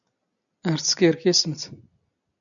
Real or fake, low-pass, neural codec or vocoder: real; 7.2 kHz; none